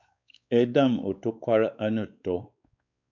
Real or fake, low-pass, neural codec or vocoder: fake; 7.2 kHz; codec, 16 kHz, 4 kbps, X-Codec, WavLM features, trained on Multilingual LibriSpeech